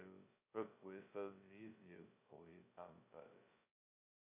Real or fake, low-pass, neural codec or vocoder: fake; 3.6 kHz; codec, 16 kHz, 0.2 kbps, FocalCodec